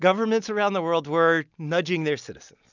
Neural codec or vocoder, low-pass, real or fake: none; 7.2 kHz; real